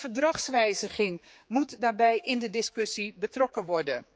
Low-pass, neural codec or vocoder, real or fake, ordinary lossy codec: none; codec, 16 kHz, 4 kbps, X-Codec, HuBERT features, trained on general audio; fake; none